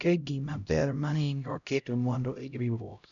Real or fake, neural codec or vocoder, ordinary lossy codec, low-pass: fake; codec, 16 kHz, 0.5 kbps, X-Codec, HuBERT features, trained on LibriSpeech; none; 7.2 kHz